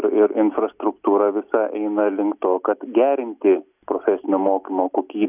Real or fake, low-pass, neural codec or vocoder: real; 3.6 kHz; none